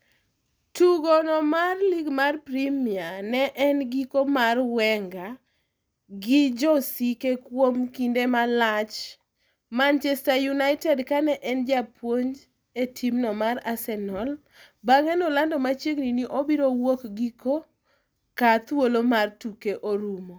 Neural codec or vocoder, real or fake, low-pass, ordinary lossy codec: none; real; none; none